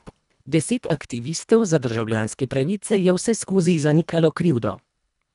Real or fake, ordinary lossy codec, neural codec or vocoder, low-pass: fake; none; codec, 24 kHz, 1.5 kbps, HILCodec; 10.8 kHz